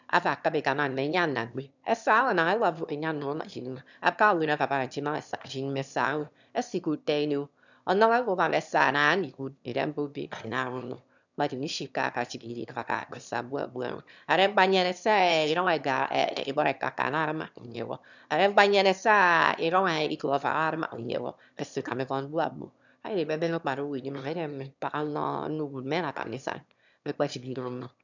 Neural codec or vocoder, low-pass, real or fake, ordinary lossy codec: autoencoder, 22.05 kHz, a latent of 192 numbers a frame, VITS, trained on one speaker; 7.2 kHz; fake; none